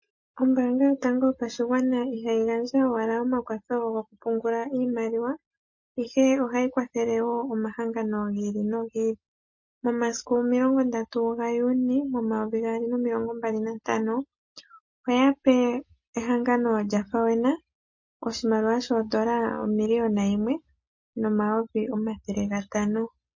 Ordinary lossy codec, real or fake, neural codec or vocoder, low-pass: MP3, 32 kbps; real; none; 7.2 kHz